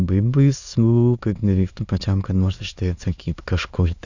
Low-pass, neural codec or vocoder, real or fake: 7.2 kHz; autoencoder, 22.05 kHz, a latent of 192 numbers a frame, VITS, trained on many speakers; fake